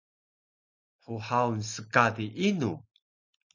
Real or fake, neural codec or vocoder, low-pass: real; none; 7.2 kHz